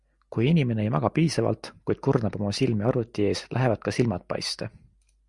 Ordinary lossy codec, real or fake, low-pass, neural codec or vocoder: Opus, 64 kbps; real; 10.8 kHz; none